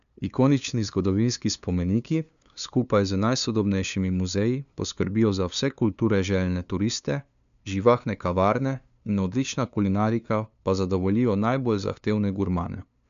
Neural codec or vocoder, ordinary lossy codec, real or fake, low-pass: codec, 16 kHz, 2 kbps, FunCodec, trained on LibriTTS, 25 frames a second; none; fake; 7.2 kHz